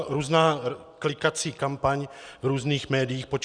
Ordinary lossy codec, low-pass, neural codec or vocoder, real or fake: Opus, 64 kbps; 9.9 kHz; none; real